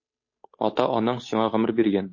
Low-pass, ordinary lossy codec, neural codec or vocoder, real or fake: 7.2 kHz; MP3, 32 kbps; codec, 16 kHz, 8 kbps, FunCodec, trained on Chinese and English, 25 frames a second; fake